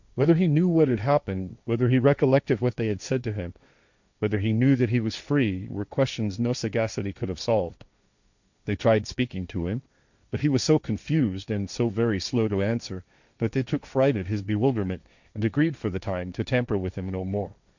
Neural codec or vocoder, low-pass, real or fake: codec, 16 kHz, 1.1 kbps, Voila-Tokenizer; 7.2 kHz; fake